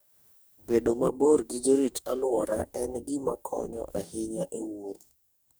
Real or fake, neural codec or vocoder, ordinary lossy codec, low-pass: fake; codec, 44.1 kHz, 2.6 kbps, DAC; none; none